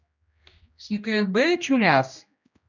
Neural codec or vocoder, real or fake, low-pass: codec, 16 kHz, 1 kbps, X-Codec, HuBERT features, trained on general audio; fake; 7.2 kHz